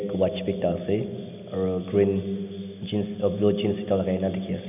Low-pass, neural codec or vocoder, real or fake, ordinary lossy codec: 3.6 kHz; none; real; none